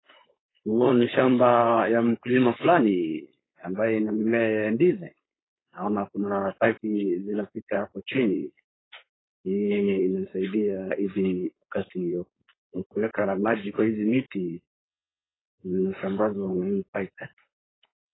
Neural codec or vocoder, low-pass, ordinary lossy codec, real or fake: codec, 16 kHz, 4.8 kbps, FACodec; 7.2 kHz; AAC, 16 kbps; fake